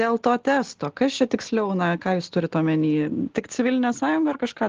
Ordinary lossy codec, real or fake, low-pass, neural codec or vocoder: Opus, 16 kbps; real; 7.2 kHz; none